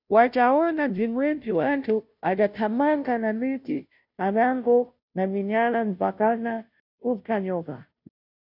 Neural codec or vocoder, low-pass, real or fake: codec, 16 kHz, 0.5 kbps, FunCodec, trained on Chinese and English, 25 frames a second; 5.4 kHz; fake